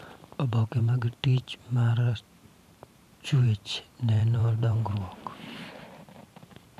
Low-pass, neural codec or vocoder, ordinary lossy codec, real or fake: 14.4 kHz; vocoder, 44.1 kHz, 128 mel bands every 512 samples, BigVGAN v2; none; fake